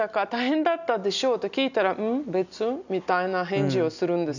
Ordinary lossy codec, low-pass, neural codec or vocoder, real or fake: none; 7.2 kHz; none; real